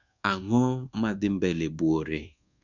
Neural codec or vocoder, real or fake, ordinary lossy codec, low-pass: codec, 24 kHz, 1.2 kbps, DualCodec; fake; none; 7.2 kHz